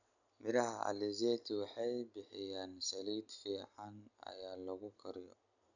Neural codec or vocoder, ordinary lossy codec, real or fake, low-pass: none; none; real; 7.2 kHz